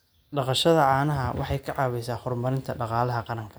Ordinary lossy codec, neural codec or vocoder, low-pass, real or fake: none; none; none; real